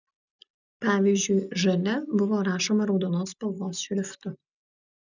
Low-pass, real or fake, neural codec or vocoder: 7.2 kHz; real; none